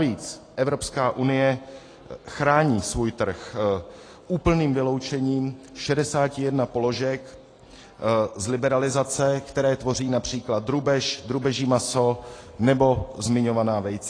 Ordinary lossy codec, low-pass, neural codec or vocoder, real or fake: AAC, 32 kbps; 9.9 kHz; none; real